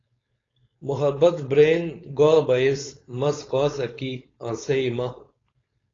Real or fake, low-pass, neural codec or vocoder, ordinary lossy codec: fake; 7.2 kHz; codec, 16 kHz, 4.8 kbps, FACodec; AAC, 32 kbps